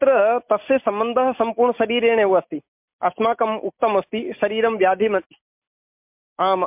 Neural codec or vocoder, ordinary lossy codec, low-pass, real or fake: none; MP3, 32 kbps; 3.6 kHz; real